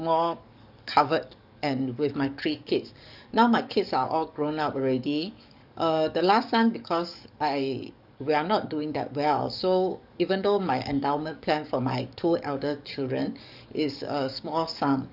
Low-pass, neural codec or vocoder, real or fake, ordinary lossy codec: 5.4 kHz; codec, 44.1 kHz, 7.8 kbps, DAC; fake; none